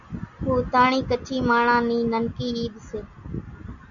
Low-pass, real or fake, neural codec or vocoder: 7.2 kHz; real; none